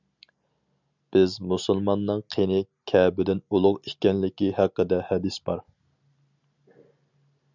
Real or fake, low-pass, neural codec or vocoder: real; 7.2 kHz; none